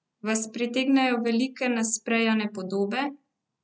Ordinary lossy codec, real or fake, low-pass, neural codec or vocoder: none; real; none; none